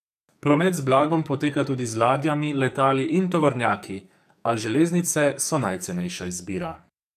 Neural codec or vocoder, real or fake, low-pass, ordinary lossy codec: codec, 44.1 kHz, 2.6 kbps, SNAC; fake; 14.4 kHz; none